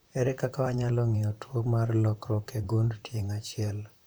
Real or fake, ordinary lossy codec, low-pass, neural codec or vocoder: fake; none; none; vocoder, 44.1 kHz, 128 mel bands, Pupu-Vocoder